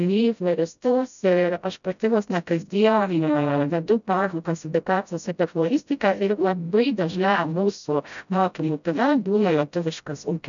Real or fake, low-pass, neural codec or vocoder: fake; 7.2 kHz; codec, 16 kHz, 0.5 kbps, FreqCodec, smaller model